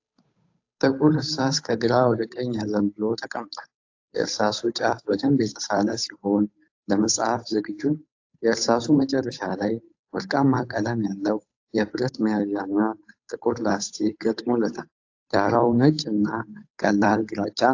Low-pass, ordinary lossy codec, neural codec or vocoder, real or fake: 7.2 kHz; AAC, 48 kbps; codec, 16 kHz, 8 kbps, FunCodec, trained on Chinese and English, 25 frames a second; fake